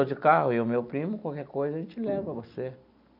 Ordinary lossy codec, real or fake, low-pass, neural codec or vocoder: none; real; 5.4 kHz; none